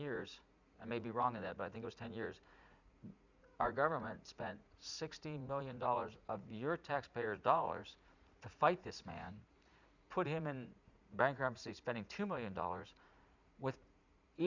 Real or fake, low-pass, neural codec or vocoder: fake; 7.2 kHz; vocoder, 44.1 kHz, 80 mel bands, Vocos